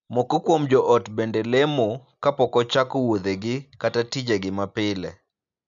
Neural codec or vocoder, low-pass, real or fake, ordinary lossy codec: none; 7.2 kHz; real; none